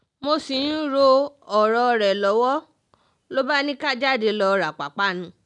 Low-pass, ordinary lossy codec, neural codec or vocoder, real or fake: 10.8 kHz; none; none; real